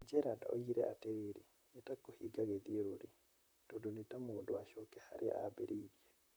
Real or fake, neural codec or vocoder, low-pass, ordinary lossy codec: fake; vocoder, 44.1 kHz, 128 mel bands, Pupu-Vocoder; none; none